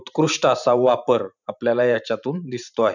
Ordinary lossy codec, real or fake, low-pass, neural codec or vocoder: none; fake; 7.2 kHz; vocoder, 44.1 kHz, 128 mel bands every 512 samples, BigVGAN v2